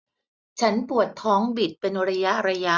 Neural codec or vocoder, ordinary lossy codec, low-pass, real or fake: none; none; none; real